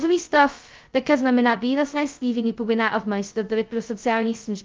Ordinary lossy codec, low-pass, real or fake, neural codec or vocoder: Opus, 24 kbps; 7.2 kHz; fake; codec, 16 kHz, 0.2 kbps, FocalCodec